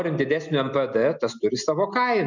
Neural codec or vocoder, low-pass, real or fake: none; 7.2 kHz; real